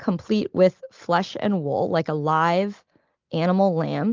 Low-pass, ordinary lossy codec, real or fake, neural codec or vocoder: 7.2 kHz; Opus, 32 kbps; real; none